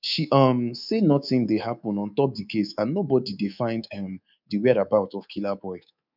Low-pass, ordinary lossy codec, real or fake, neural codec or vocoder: 5.4 kHz; none; fake; codec, 24 kHz, 3.1 kbps, DualCodec